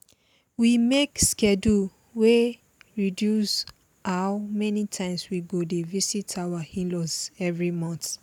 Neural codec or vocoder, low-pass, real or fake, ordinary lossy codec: none; 19.8 kHz; real; none